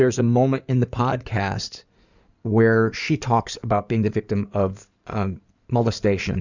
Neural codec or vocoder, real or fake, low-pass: codec, 16 kHz in and 24 kHz out, 2.2 kbps, FireRedTTS-2 codec; fake; 7.2 kHz